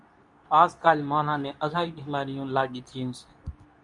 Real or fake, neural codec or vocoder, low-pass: fake; codec, 24 kHz, 0.9 kbps, WavTokenizer, medium speech release version 2; 10.8 kHz